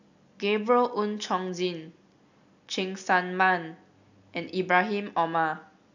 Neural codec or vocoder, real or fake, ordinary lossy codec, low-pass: none; real; none; 7.2 kHz